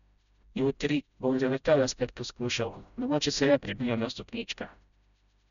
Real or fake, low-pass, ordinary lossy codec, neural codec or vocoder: fake; 7.2 kHz; none; codec, 16 kHz, 0.5 kbps, FreqCodec, smaller model